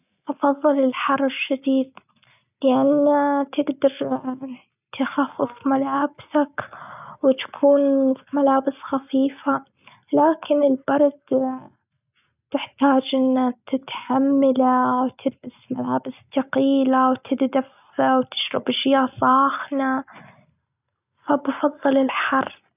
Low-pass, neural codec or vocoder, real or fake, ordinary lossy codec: 3.6 kHz; none; real; none